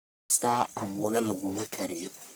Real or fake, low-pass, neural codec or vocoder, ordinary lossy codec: fake; none; codec, 44.1 kHz, 1.7 kbps, Pupu-Codec; none